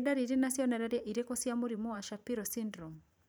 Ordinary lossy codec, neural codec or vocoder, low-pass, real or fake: none; vocoder, 44.1 kHz, 128 mel bands every 512 samples, BigVGAN v2; none; fake